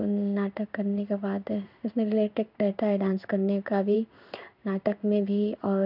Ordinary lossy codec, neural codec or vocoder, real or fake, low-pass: none; codec, 16 kHz in and 24 kHz out, 1 kbps, XY-Tokenizer; fake; 5.4 kHz